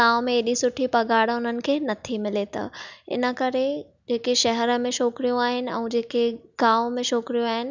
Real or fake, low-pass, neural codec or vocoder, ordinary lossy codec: real; 7.2 kHz; none; none